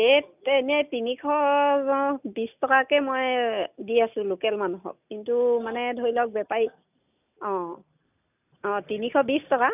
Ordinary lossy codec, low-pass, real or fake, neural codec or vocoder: none; 3.6 kHz; real; none